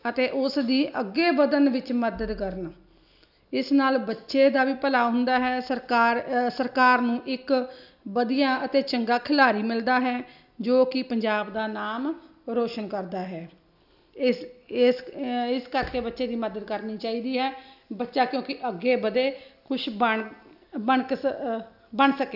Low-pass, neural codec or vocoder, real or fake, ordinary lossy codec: 5.4 kHz; none; real; none